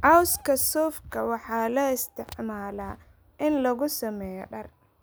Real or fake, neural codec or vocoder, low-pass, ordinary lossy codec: real; none; none; none